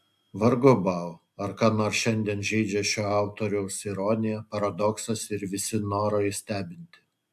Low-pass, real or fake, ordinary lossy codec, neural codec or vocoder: 14.4 kHz; real; AAC, 96 kbps; none